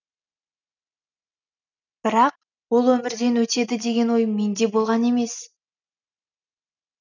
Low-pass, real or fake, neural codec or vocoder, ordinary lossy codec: 7.2 kHz; real; none; none